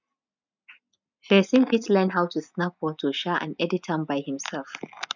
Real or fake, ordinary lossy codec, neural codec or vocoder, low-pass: real; none; none; 7.2 kHz